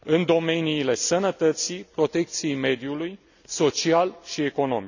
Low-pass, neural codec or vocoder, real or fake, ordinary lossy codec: 7.2 kHz; none; real; none